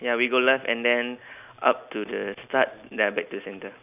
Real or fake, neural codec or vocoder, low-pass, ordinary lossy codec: real; none; 3.6 kHz; none